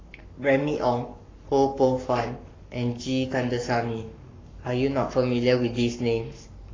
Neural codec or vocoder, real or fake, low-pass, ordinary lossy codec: codec, 44.1 kHz, 7.8 kbps, Pupu-Codec; fake; 7.2 kHz; AAC, 32 kbps